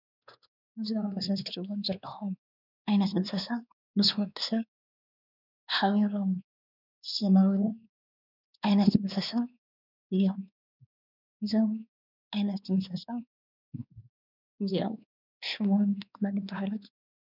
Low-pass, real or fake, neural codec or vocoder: 5.4 kHz; fake; codec, 16 kHz, 2 kbps, X-Codec, WavLM features, trained on Multilingual LibriSpeech